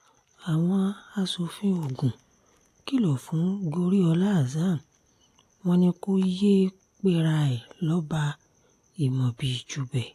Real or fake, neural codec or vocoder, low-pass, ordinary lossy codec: real; none; 14.4 kHz; AAC, 64 kbps